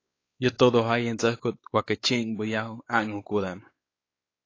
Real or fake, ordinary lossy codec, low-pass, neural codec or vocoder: fake; AAC, 32 kbps; 7.2 kHz; codec, 16 kHz, 4 kbps, X-Codec, WavLM features, trained on Multilingual LibriSpeech